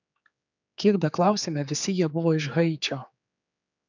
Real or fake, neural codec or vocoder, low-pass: fake; codec, 16 kHz, 4 kbps, X-Codec, HuBERT features, trained on general audio; 7.2 kHz